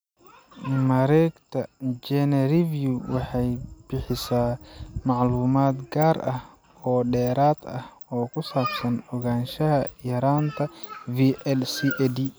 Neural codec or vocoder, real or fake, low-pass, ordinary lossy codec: none; real; none; none